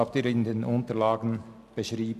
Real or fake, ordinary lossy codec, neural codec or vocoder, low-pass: real; none; none; 14.4 kHz